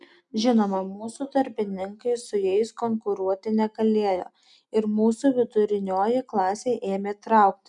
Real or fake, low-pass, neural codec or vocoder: real; 10.8 kHz; none